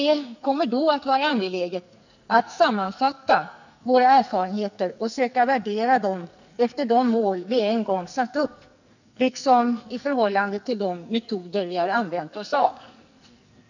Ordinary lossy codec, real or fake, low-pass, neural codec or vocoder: none; fake; 7.2 kHz; codec, 44.1 kHz, 2.6 kbps, SNAC